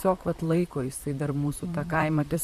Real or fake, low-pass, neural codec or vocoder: fake; 14.4 kHz; vocoder, 44.1 kHz, 128 mel bands, Pupu-Vocoder